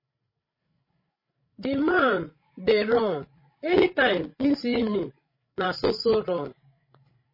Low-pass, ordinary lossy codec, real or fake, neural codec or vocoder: 5.4 kHz; MP3, 24 kbps; fake; codec, 16 kHz, 16 kbps, FreqCodec, larger model